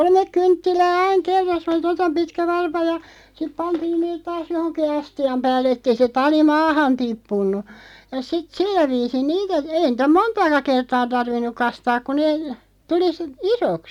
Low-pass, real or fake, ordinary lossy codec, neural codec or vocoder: 19.8 kHz; real; none; none